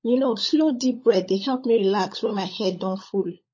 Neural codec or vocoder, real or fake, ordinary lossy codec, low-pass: codec, 16 kHz, 16 kbps, FunCodec, trained on LibriTTS, 50 frames a second; fake; MP3, 32 kbps; 7.2 kHz